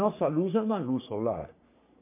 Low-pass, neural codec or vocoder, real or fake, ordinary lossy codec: 3.6 kHz; codec, 44.1 kHz, 3.4 kbps, Pupu-Codec; fake; MP3, 24 kbps